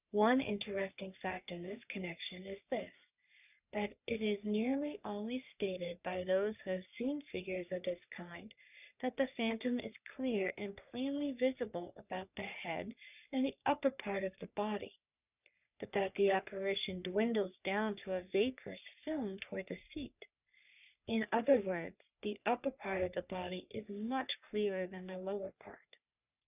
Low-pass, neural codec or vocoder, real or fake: 3.6 kHz; codec, 44.1 kHz, 3.4 kbps, Pupu-Codec; fake